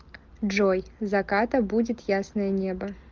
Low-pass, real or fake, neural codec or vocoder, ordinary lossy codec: 7.2 kHz; real; none; Opus, 32 kbps